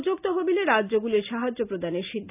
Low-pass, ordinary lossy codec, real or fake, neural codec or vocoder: 3.6 kHz; none; real; none